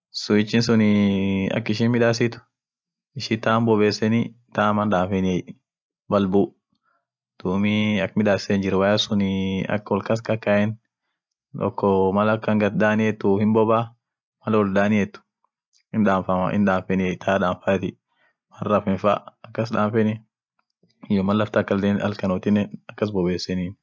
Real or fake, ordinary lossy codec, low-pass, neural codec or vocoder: real; none; none; none